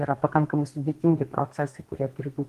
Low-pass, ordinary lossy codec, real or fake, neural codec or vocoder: 14.4 kHz; Opus, 24 kbps; fake; codec, 32 kHz, 1.9 kbps, SNAC